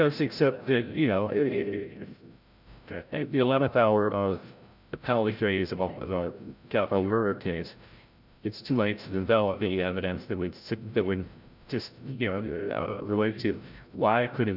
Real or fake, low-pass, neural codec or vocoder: fake; 5.4 kHz; codec, 16 kHz, 0.5 kbps, FreqCodec, larger model